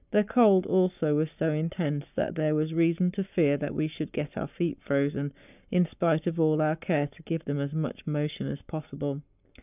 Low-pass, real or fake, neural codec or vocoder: 3.6 kHz; fake; codec, 44.1 kHz, 7.8 kbps, Pupu-Codec